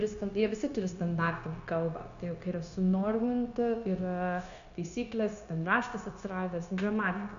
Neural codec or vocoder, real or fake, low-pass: codec, 16 kHz, 0.9 kbps, LongCat-Audio-Codec; fake; 7.2 kHz